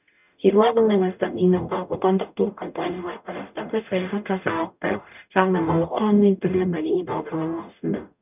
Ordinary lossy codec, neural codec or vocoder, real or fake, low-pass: none; codec, 44.1 kHz, 0.9 kbps, DAC; fake; 3.6 kHz